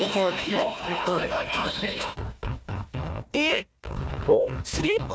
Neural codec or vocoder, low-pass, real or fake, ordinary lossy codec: codec, 16 kHz, 1 kbps, FunCodec, trained on Chinese and English, 50 frames a second; none; fake; none